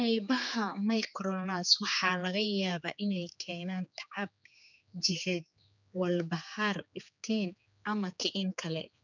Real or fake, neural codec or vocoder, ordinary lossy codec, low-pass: fake; codec, 16 kHz, 4 kbps, X-Codec, HuBERT features, trained on general audio; none; 7.2 kHz